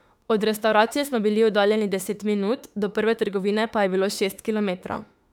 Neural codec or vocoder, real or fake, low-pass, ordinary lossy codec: autoencoder, 48 kHz, 32 numbers a frame, DAC-VAE, trained on Japanese speech; fake; 19.8 kHz; none